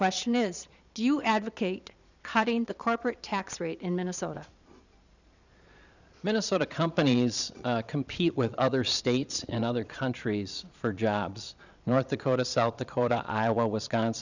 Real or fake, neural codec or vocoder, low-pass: fake; vocoder, 22.05 kHz, 80 mel bands, Vocos; 7.2 kHz